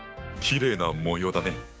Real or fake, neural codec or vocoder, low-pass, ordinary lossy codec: fake; codec, 16 kHz, 6 kbps, DAC; none; none